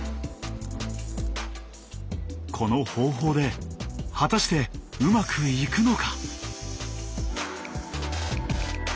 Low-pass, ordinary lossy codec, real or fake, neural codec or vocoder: none; none; real; none